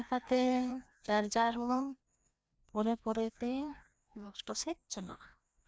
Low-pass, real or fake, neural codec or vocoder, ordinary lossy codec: none; fake; codec, 16 kHz, 1 kbps, FreqCodec, larger model; none